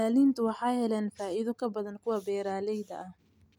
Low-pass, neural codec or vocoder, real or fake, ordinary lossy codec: 19.8 kHz; none; real; none